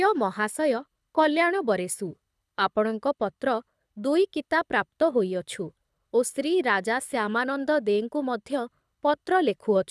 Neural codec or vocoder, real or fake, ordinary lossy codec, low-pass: codec, 24 kHz, 6 kbps, HILCodec; fake; none; none